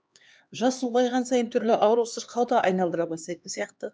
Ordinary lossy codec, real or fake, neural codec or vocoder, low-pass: none; fake; codec, 16 kHz, 2 kbps, X-Codec, HuBERT features, trained on LibriSpeech; none